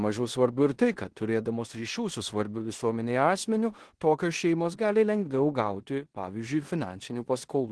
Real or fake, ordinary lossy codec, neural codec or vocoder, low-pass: fake; Opus, 16 kbps; codec, 16 kHz in and 24 kHz out, 0.9 kbps, LongCat-Audio-Codec, four codebook decoder; 10.8 kHz